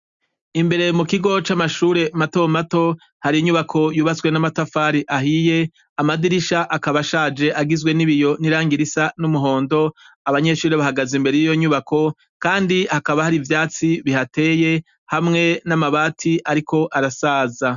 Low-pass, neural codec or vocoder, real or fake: 7.2 kHz; none; real